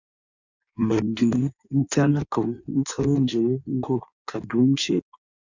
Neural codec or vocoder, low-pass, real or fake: codec, 16 kHz in and 24 kHz out, 1.1 kbps, FireRedTTS-2 codec; 7.2 kHz; fake